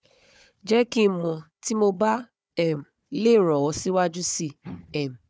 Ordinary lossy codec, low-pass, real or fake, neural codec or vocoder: none; none; fake; codec, 16 kHz, 4 kbps, FunCodec, trained on Chinese and English, 50 frames a second